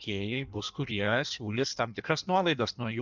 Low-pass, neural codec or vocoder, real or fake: 7.2 kHz; codec, 16 kHz in and 24 kHz out, 1.1 kbps, FireRedTTS-2 codec; fake